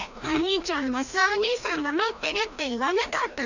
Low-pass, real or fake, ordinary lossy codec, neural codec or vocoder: 7.2 kHz; fake; none; codec, 16 kHz, 1 kbps, FreqCodec, larger model